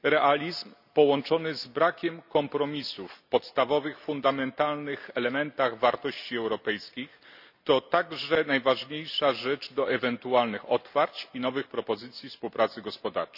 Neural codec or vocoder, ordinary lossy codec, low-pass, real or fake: none; none; 5.4 kHz; real